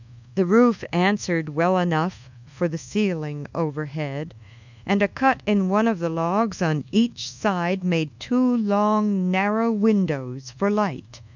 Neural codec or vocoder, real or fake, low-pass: codec, 24 kHz, 1.2 kbps, DualCodec; fake; 7.2 kHz